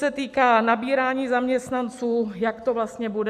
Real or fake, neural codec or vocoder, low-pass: real; none; 14.4 kHz